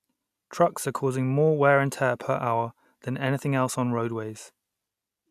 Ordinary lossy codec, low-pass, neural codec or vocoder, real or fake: none; 14.4 kHz; none; real